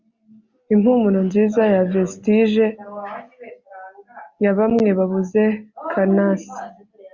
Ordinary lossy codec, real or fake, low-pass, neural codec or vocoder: Opus, 64 kbps; real; 7.2 kHz; none